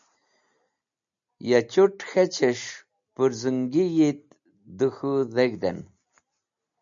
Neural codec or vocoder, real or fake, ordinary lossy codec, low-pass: none; real; MP3, 96 kbps; 7.2 kHz